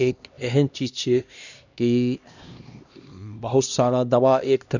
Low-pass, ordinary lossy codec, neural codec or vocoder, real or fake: 7.2 kHz; none; codec, 16 kHz, 1 kbps, X-Codec, HuBERT features, trained on LibriSpeech; fake